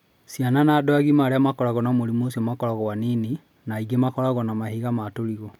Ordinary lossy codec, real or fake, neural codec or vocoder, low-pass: none; real; none; 19.8 kHz